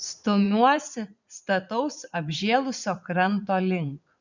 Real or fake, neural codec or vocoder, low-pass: fake; vocoder, 44.1 kHz, 80 mel bands, Vocos; 7.2 kHz